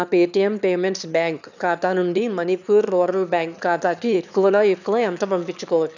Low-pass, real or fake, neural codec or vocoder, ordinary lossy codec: 7.2 kHz; fake; autoencoder, 22.05 kHz, a latent of 192 numbers a frame, VITS, trained on one speaker; none